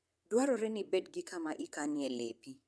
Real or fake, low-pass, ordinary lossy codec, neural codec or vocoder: fake; none; none; vocoder, 22.05 kHz, 80 mel bands, WaveNeXt